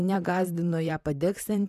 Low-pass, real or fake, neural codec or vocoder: 14.4 kHz; fake; vocoder, 44.1 kHz, 128 mel bands, Pupu-Vocoder